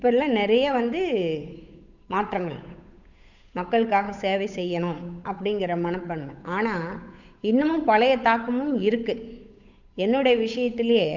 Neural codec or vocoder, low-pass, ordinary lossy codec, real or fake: codec, 16 kHz, 8 kbps, FunCodec, trained on Chinese and English, 25 frames a second; 7.2 kHz; none; fake